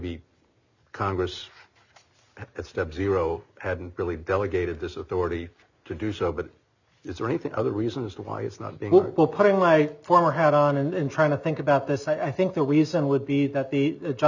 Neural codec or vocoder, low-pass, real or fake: none; 7.2 kHz; real